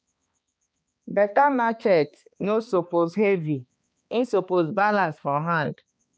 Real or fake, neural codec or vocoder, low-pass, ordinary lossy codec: fake; codec, 16 kHz, 2 kbps, X-Codec, HuBERT features, trained on balanced general audio; none; none